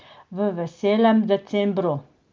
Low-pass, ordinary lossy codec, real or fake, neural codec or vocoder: 7.2 kHz; Opus, 32 kbps; real; none